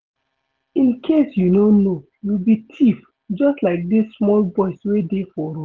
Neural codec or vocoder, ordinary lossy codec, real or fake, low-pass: none; Opus, 16 kbps; real; 7.2 kHz